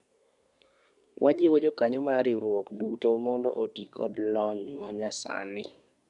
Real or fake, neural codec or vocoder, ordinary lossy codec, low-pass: fake; codec, 24 kHz, 1 kbps, SNAC; none; 10.8 kHz